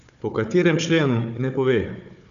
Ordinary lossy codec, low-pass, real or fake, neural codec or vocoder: none; 7.2 kHz; fake; codec, 16 kHz, 4 kbps, FunCodec, trained on Chinese and English, 50 frames a second